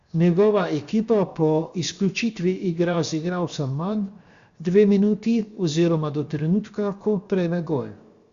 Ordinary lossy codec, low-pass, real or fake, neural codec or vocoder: Opus, 64 kbps; 7.2 kHz; fake; codec, 16 kHz, 0.7 kbps, FocalCodec